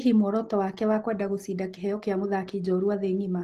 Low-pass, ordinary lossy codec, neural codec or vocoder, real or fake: 14.4 kHz; Opus, 16 kbps; none; real